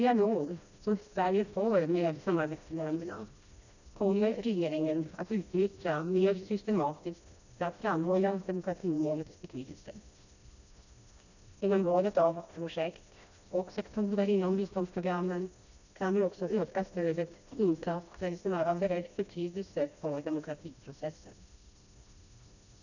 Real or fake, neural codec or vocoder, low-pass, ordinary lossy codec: fake; codec, 16 kHz, 1 kbps, FreqCodec, smaller model; 7.2 kHz; none